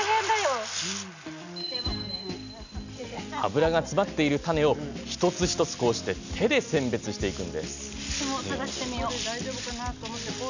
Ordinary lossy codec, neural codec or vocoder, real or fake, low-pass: none; none; real; 7.2 kHz